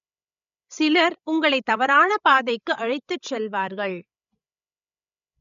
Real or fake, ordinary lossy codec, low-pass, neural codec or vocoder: fake; none; 7.2 kHz; codec, 16 kHz, 16 kbps, FreqCodec, larger model